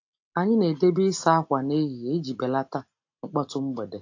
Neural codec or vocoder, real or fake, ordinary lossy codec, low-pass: none; real; AAC, 48 kbps; 7.2 kHz